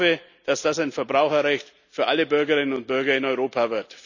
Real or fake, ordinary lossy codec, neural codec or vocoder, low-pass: real; none; none; 7.2 kHz